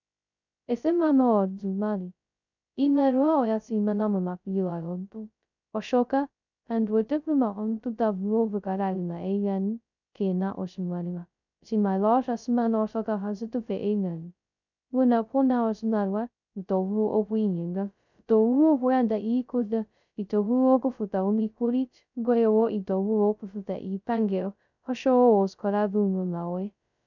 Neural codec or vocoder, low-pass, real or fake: codec, 16 kHz, 0.2 kbps, FocalCodec; 7.2 kHz; fake